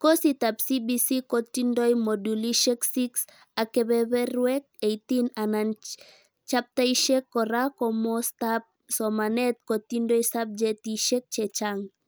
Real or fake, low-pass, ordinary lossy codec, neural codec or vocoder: real; none; none; none